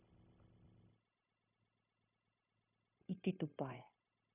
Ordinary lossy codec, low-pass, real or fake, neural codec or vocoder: none; 3.6 kHz; fake; codec, 16 kHz, 0.9 kbps, LongCat-Audio-Codec